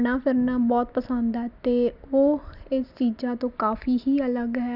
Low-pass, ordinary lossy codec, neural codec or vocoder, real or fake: 5.4 kHz; none; none; real